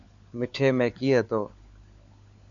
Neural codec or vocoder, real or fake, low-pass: codec, 16 kHz, 4 kbps, FunCodec, trained on LibriTTS, 50 frames a second; fake; 7.2 kHz